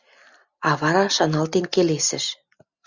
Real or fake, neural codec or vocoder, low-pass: real; none; 7.2 kHz